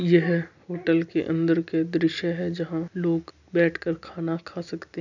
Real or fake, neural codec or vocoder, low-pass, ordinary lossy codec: real; none; 7.2 kHz; none